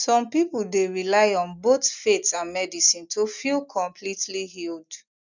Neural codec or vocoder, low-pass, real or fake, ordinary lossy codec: none; 7.2 kHz; real; none